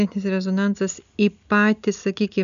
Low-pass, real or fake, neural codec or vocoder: 7.2 kHz; real; none